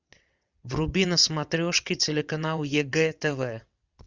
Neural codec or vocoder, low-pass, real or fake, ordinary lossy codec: vocoder, 22.05 kHz, 80 mel bands, WaveNeXt; 7.2 kHz; fake; Opus, 64 kbps